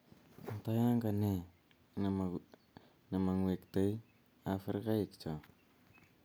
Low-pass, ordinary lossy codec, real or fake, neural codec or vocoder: none; none; real; none